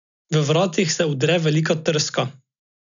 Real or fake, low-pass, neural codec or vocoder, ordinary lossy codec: real; 7.2 kHz; none; none